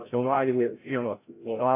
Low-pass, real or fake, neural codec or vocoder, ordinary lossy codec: 3.6 kHz; fake; codec, 16 kHz, 0.5 kbps, FreqCodec, larger model; AAC, 24 kbps